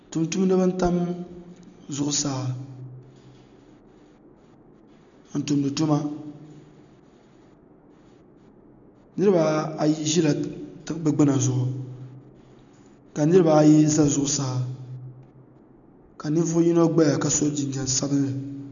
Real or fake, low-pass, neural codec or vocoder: real; 7.2 kHz; none